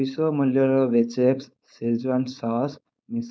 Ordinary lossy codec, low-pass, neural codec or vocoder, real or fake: none; none; codec, 16 kHz, 4.8 kbps, FACodec; fake